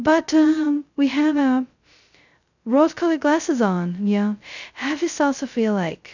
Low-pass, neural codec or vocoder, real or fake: 7.2 kHz; codec, 16 kHz, 0.2 kbps, FocalCodec; fake